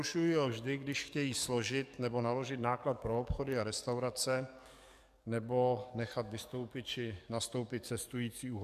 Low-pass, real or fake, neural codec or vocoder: 14.4 kHz; fake; codec, 44.1 kHz, 7.8 kbps, DAC